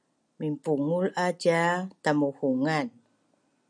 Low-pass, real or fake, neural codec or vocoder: 9.9 kHz; real; none